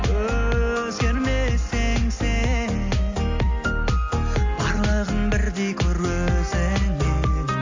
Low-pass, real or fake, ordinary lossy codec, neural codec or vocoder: 7.2 kHz; real; AAC, 48 kbps; none